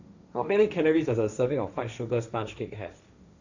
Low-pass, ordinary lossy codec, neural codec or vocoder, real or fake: 7.2 kHz; none; codec, 16 kHz, 1.1 kbps, Voila-Tokenizer; fake